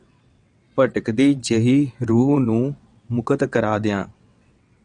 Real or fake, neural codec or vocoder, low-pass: fake; vocoder, 22.05 kHz, 80 mel bands, WaveNeXt; 9.9 kHz